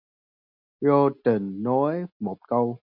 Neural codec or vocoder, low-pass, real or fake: none; 5.4 kHz; real